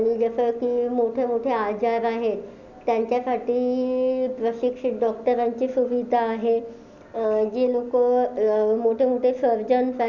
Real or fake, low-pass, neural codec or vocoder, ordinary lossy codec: real; 7.2 kHz; none; none